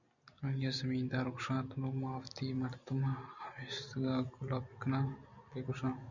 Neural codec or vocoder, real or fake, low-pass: none; real; 7.2 kHz